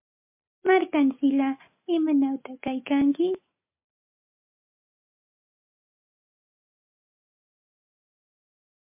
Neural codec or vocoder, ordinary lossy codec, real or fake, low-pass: none; MP3, 32 kbps; real; 3.6 kHz